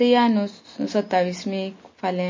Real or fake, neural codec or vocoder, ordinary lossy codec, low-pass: real; none; MP3, 32 kbps; 7.2 kHz